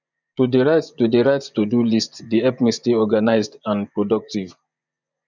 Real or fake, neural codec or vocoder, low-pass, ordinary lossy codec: fake; vocoder, 24 kHz, 100 mel bands, Vocos; 7.2 kHz; none